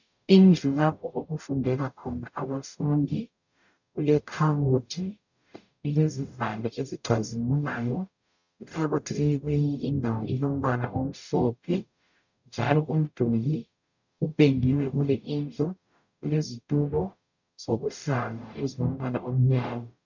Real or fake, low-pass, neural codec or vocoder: fake; 7.2 kHz; codec, 44.1 kHz, 0.9 kbps, DAC